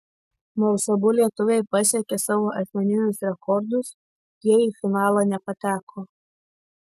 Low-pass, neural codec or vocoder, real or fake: 14.4 kHz; none; real